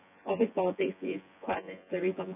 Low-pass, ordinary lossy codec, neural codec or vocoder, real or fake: 3.6 kHz; none; vocoder, 24 kHz, 100 mel bands, Vocos; fake